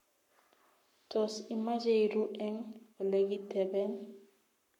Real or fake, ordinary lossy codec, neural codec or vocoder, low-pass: fake; none; codec, 44.1 kHz, 7.8 kbps, Pupu-Codec; 19.8 kHz